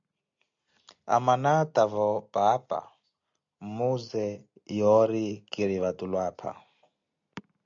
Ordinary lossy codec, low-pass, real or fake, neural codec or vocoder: MP3, 64 kbps; 7.2 kHz; real; none